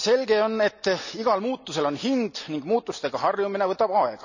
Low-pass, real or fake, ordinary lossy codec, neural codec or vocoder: 7.2 kHz; real; none; none